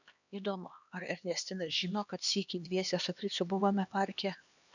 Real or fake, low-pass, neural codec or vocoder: fake; 7.2 kHz; codec, 16 kHz, 2 kbps, X-Codec, HuBERT features, trained on LibriSpeech